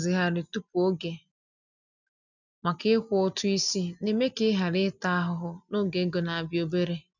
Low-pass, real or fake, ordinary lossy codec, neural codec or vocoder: 7.2 kHz; real; none; none